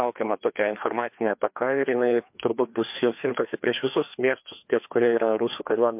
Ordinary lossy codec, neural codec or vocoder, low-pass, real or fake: MP3, 32 kbps; codec, 16 kHz, 2 kbps, FreqCodec, larger model; 3.6 kHz; fake